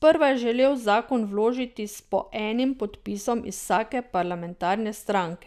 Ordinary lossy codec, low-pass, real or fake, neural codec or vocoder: none; 14.4 kHz; real; none